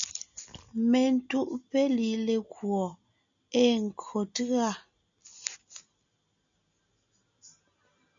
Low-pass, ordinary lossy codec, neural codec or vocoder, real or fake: 7.2 kHz; MP3, 96 kbps; none; real